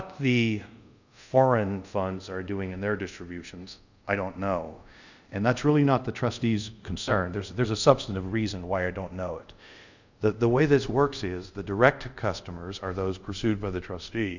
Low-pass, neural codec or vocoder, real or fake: 7.2 kHz; codec, 24 kHz, 0.5 kbps, DualCodec; fake